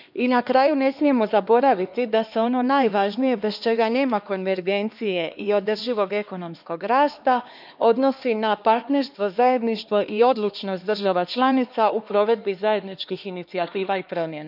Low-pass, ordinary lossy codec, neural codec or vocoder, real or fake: 5.4 kHz; none; codec, 16 kHz, 2 kbps, X-Codec, HuBERT features, trained on LibriSpeech; fake